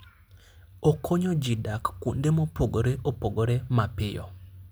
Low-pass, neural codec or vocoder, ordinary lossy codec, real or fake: none; none; none; real